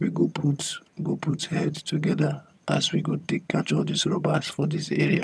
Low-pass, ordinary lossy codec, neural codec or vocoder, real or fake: none; none; vocoder, 22.05 kHz, 80 mel bands, HiFi-GAN; fake